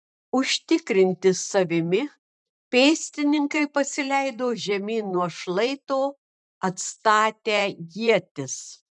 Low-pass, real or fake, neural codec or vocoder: 10.8 kHz; fake; vocoder, 44.1 kHz, 128 mel bands every 512 samples, BigVGAN v2